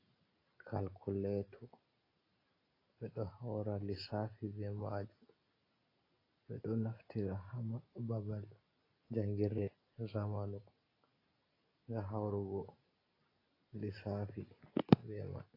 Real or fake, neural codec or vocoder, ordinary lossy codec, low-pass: real; none; AAC, 24 kbps; 5.4 kHz